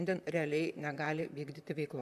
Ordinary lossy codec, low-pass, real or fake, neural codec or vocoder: MP3, 96 kbps; 14.4 kHz; fake; vocoder, 44.1 kHz, 128 mel bands, Pupu-Vocoder